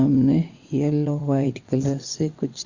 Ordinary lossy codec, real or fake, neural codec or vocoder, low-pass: Opus, 64 kbps; fake; vocoder, 22.05 kHz, 80 mel bands, WaveNeXt; 7.2 kHz